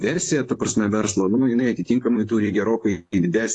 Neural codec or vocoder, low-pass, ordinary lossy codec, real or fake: vocoder, 44.1 kHz, 128 mel bands, Pupu-Vocoder; 10.8 kHz; AAC, 48 kbps; fake